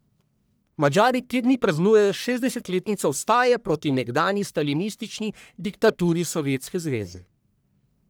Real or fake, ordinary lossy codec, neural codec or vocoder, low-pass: fake; none; codec, 44.1 kHz, 1.7 kbps, Pupu-Codec; none